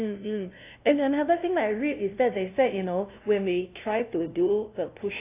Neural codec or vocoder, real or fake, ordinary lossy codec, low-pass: codec, 16 kHz, 0.5 kbps, FunCodec, trained on LibriTTS, 25 frames a second; fake; AAC, 24 kbps; 3.6 kHz